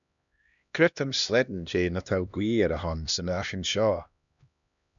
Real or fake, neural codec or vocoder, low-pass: fake; codec, 16 kHz, 1 kbps, X-Codec, HuBERT features, trained on LibriSpeech; 7.2 kHz